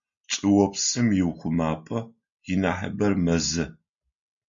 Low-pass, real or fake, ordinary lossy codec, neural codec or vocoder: 7.2 kHz; real; MP3, 64 kbps; none